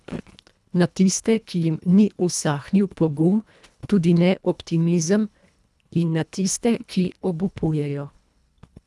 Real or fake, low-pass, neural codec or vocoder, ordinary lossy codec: fake; none; codec, 24 kHz, 1.5 kbps, HILCodec; none